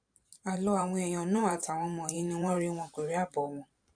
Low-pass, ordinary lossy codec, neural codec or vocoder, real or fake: 9.9 kHz; none; vocoder, 44.1 kHz, 128 mel bands every 512 samples, BigVGAN v2; fake